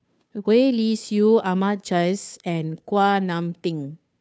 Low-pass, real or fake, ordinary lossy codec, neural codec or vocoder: none; fake; none; codec, 16 kHz, 2 kbps, FunCodec, trained on Chinese and English, 25 frames a second